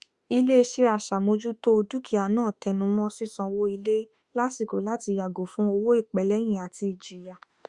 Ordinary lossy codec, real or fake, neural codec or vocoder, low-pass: Opus, 64 kbps; fake; autoencoder, 48 kHz, 32 numbers a frame, DAC-VAE, trained on Japanese speech; 10.8 kHz